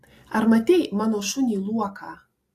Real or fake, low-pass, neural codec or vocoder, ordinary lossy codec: real; 14.4 kHz; none; AAC, 48 kbps